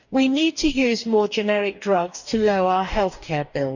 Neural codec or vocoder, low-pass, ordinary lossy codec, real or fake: codec, 44.1 kHz, 2.6 kbps, DAC; 7.2 kHz; none; fake